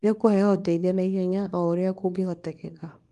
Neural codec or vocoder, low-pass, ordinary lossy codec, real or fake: codec, 24 kHz, 0.9 kbps, WavTokenizer, small release; 10.8 kHz; Opus, 32 kbps; fake